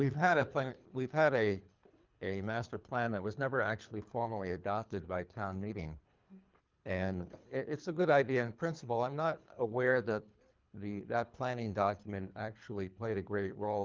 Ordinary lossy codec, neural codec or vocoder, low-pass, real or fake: Opus, 24 kbps; codec, 24 kHz, 3 kbps, HILCodec; 7.2 kHz; fake